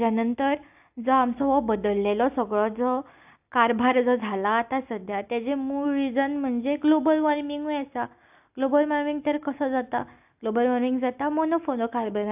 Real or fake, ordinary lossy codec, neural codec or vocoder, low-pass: real; AAC, 32 kbps; none; 3.6 kHz